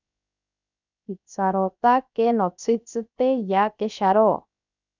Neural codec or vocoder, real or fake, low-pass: codec, 16 kHz, 0.7 kbps, FocalCodec; fake; 7.2 kHz